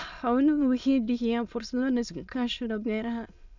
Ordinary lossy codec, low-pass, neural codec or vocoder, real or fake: none; 7.2 kHz; autoencoder, 22.05 kHz, a latent of 192 numbers a frame, VITS, trained on many speakers; fake